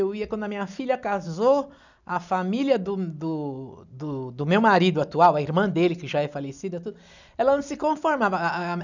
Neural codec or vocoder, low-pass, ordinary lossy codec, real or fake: none; 7.2 kHz; none; real